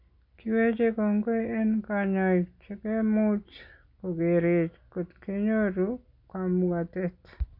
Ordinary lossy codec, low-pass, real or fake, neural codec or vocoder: none; 5.4 kHz; real; none